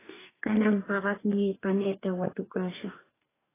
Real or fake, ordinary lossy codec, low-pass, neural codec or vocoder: fake; AAC, 16 kbps; 3.6 kHz; codec, 44.1 kHz, 2.6 kbps, DAC